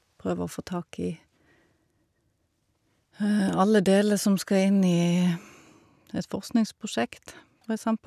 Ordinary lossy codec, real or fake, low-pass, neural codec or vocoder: none; real; 14.4 kHz; none